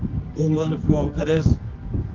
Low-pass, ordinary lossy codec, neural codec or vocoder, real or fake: 7.2 kHz; Opus, 32 kbps; codec, 24 kHz, 0.9 kbps, WavTokenizer, medium music audio release; fake